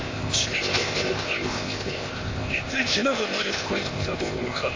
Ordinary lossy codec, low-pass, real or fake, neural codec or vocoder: AAC, 32 kbps; 7.2 kHz; fake; codec, 16 kHz, 0.8 kbps, ZipCodec